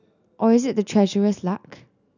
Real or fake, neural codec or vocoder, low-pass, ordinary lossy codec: real; none; 7.2 kHz; none